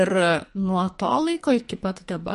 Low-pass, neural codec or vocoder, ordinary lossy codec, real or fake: 14.4 kHz; codec, 44.1 kHz, 2.6 kbps, SNAC; MP3, 48 kbps; fake